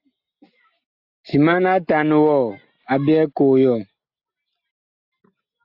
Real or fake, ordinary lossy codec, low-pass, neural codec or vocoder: real; AAC, 48 kbps; 5.4 kHz; none